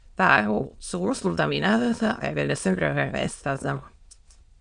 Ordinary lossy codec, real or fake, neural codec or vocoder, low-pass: Opus, 64 kbps; fake; autoencoder, 22.05 kHz, a latent of 192 numbers a frame, VITS, trained on many speakers; 9.9 kHz